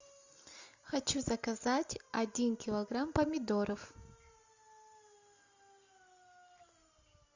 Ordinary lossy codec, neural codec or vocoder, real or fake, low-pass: Opus, 64 kbps; none; real; 7.2 kHz